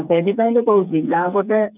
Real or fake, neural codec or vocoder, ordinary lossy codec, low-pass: fake; codec, 16 kHz, 4 kbps, FreqCodec, smaller model; none; 3.6 kHz